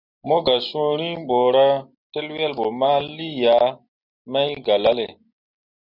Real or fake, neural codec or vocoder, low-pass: real; none; 5.4 kHz